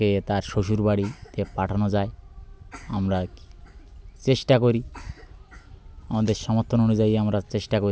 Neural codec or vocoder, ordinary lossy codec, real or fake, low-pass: none; none; real; none